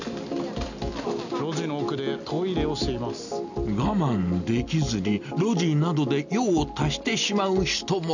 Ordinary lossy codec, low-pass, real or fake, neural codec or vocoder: none; 7.2 kHz; real; none